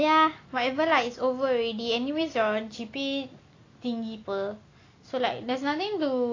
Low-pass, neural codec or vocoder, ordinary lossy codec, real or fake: 7.2 kHz; none; AAC, 32 kbps; real